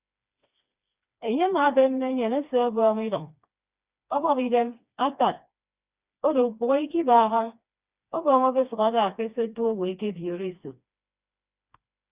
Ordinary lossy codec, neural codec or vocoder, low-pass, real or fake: Opus, 64 kbps; codec, 16 kHz, 2 kbps, FreqCodec, smaller model; 3.6 kHz; fake